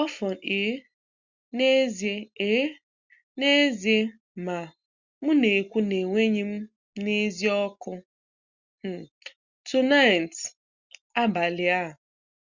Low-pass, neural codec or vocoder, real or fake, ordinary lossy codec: 7.2 kHz; none; real; Opus, 64 kbps